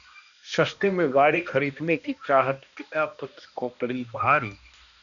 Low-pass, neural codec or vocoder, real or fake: 7.2 kHz; codec, 16 kHz, 1 kbps, X-Codec, HuBERT features, trained on balanced general audio; fake